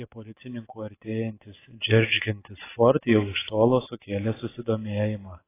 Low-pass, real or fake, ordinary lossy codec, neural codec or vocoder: 3.6 kHz; real; AAC, 16 kbps; none